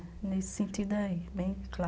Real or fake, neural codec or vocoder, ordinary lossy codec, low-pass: real; none; none; none